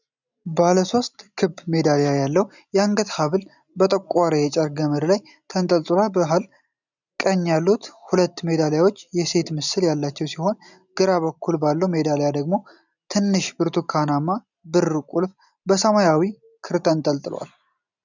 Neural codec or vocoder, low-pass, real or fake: none; 7.2 kHz; real